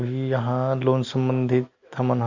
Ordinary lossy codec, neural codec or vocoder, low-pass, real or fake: Opus, 64 kbps; none; 7.2 kHz; real